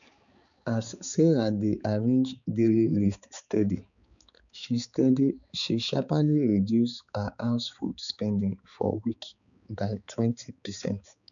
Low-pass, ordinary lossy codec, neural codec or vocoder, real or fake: 7.2 kHz; none; codec, 16 kHz, 4 kbps, X-Codec, HuBERT features, trained on balanced general audio; fake